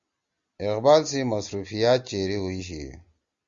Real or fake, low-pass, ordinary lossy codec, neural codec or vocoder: real; 7.2 kHz; Opus, 64 kbps; none